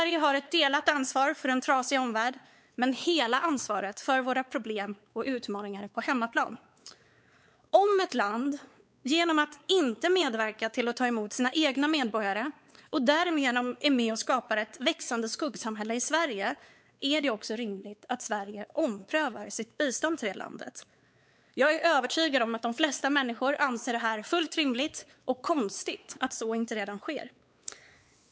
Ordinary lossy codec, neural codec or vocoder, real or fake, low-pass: none; codec, 16 kHz, 4 kbps, X-Codec, WavLM features, trained on Multilingual LibriSpeech; fake; none